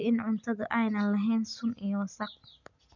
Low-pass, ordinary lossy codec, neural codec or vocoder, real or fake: 7.2 kHz; none; none; real